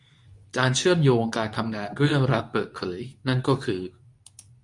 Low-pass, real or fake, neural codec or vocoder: 10.8 kHz; fake; codec, 24 kHz, 0.9 kbps, WavTokenizer, medium speech release version 2